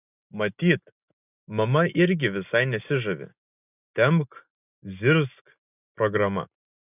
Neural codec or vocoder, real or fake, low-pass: none; real; 3.6 kHz